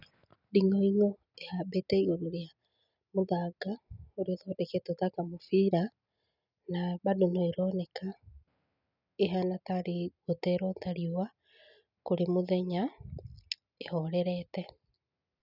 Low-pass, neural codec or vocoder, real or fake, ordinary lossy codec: 5.4 kHz; none; real; none